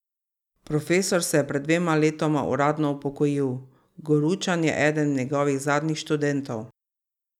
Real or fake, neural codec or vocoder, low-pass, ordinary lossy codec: real; none; 19.8 kHz; none